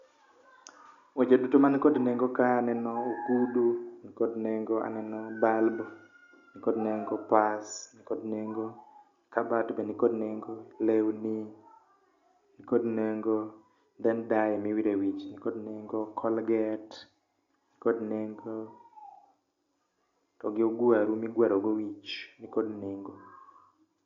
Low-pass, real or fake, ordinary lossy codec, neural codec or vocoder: 7.2 kHz; real; Opus, 64 kbps; none